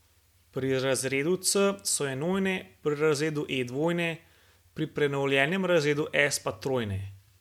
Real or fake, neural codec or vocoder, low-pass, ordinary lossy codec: real; none; 19.8 kHz; MP3, 96 kbps